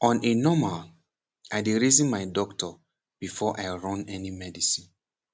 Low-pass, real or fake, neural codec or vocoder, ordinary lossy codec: none; real; none; none